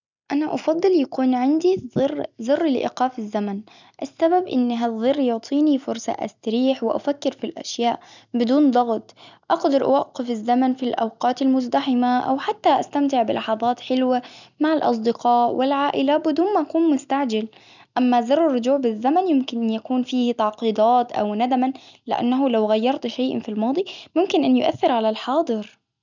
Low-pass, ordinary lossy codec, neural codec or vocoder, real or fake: 7.2 kHz; none; none; real